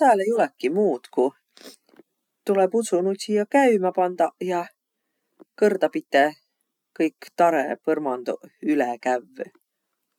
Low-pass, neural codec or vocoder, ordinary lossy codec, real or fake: 19.8 kHz; none; none; real